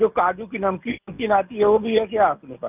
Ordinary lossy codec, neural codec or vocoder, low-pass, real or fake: none; none; 3.6 kHz; real